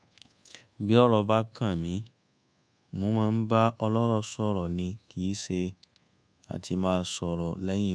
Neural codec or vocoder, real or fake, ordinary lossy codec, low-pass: codec, 24 kHz, 1.2 kbps, DualCodec; fake; none; 9.9 kHz